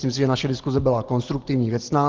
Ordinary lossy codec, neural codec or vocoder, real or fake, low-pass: Opus, 16 kbps; none; real; 7.2 kHz